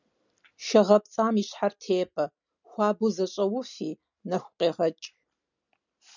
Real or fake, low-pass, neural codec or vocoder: real; 7.2 kHz; none